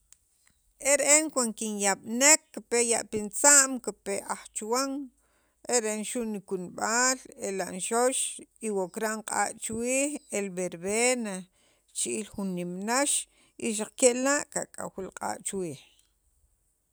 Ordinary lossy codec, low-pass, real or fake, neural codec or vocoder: none; none; real; none